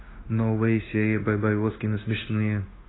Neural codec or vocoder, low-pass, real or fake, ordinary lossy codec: codec, 16 kHz, 0.9 kbps, LongCat-Audio-Codec; 7.2 kHz; fake; AAC, 16 kbps